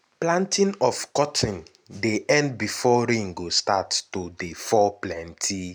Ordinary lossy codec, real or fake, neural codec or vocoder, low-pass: none; real; none; none